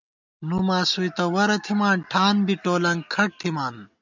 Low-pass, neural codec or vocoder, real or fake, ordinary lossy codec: 7.2 kHz; none; real; MP3, 64 kbps